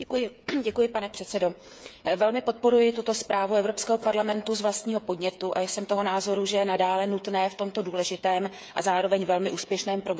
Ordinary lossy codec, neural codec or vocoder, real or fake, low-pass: none; codec, 16 kHz, 8 kbps, FreqCodec, smaller model; fake; none